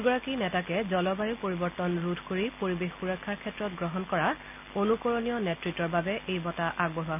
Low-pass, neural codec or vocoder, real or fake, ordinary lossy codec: 3.6 kHz; none; real; none